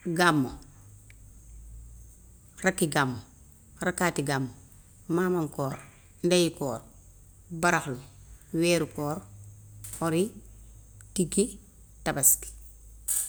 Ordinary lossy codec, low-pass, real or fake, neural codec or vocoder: none; none; real; none